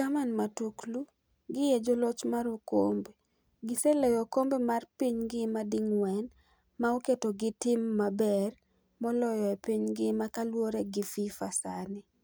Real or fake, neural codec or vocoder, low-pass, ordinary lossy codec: real; none; none; none